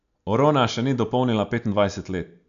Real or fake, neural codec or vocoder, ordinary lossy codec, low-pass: real; none; none; 7.2 kHz